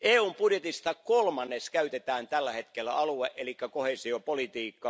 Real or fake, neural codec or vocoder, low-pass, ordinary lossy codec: real; none; none; none